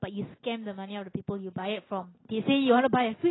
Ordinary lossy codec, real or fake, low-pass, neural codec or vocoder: AAC, 16 kbps; real; 7.2 kHz; none